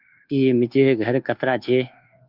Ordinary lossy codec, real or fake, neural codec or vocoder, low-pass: Opus, 24 kbps; fake; codec, 24 kHz, 1.2 kbps, DualCodec; 5.4 kHz